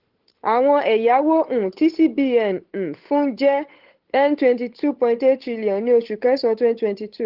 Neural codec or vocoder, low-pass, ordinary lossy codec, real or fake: codec, 16 kHz, 8 kbps, FunCodec, trained on Chinese and English, 25 frames a second; 5.4 kHz; Opus, 16 kbps; fake